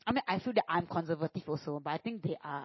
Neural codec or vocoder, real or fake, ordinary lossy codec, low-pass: none; real; MP3, 24 kbps; 7.2 kHz